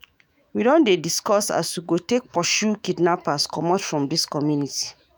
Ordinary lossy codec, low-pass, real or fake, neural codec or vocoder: none; none; fake; autoencoder, 48 kHz, 128 numbers a frame, DAC-VAE, trained on Japanese speech